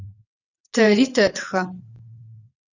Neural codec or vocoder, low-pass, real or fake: vocoder, 22.05 kHz, 80 mel bands, WaveNeXt; 7.2 kHz; fake